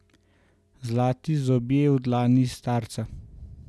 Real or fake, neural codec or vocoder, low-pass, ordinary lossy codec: real; none; none; none